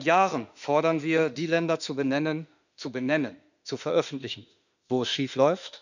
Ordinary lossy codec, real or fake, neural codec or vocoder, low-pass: none; fake; autoencoder, 48 kHz, 32 numbers a frame, DAC-VAE, trained on Japanese speech; 7.2 kHz